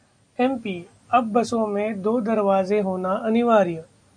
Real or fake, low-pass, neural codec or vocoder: real; 9.9 kHz; none